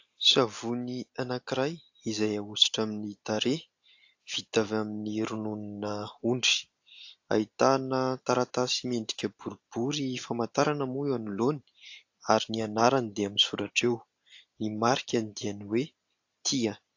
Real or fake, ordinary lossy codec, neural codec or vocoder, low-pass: real; AAC, 48 kbps; none; 7.2 kHz